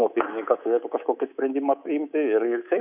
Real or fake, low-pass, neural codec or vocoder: fake; 3.6 kHz; codec, 24 kHz, 3.1 kbps, DualCodec